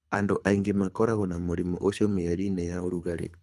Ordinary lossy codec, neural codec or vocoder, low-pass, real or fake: none; codec, 24 kHz, 3 kbps, HILCodec; none; fake